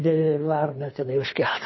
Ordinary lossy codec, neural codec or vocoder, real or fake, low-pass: MP3, 24 kbps; codec, 24 kHz, 3 kbps, HILCodec; fake; 7.2 kHz